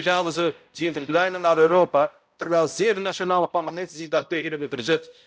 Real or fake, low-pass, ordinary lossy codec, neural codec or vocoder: fake; none; none; codec, 16 kHz, 0.5 kbps, X-Codec, HuBERT features, trained on balanced general audio